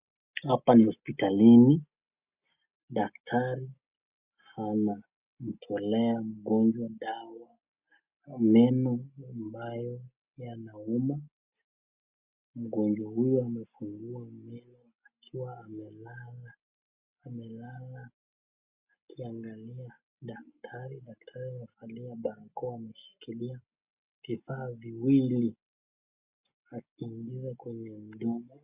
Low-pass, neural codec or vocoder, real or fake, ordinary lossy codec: 3.6 kHz; none; real; Opus, 64 kbps